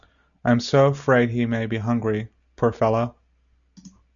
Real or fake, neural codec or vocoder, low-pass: real; none; 7.2 kHz